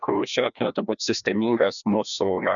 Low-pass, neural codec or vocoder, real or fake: 7.2 kHz; codec, 16 kHz, 1 kbps, FreqCodec, larger model; fake